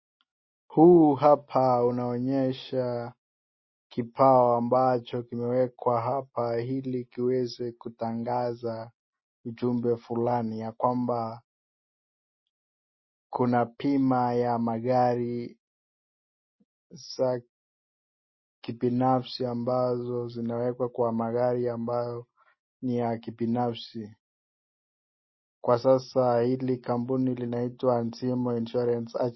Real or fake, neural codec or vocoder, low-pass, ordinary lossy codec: real; none; 7.2 kHz; MP3, 24 kbps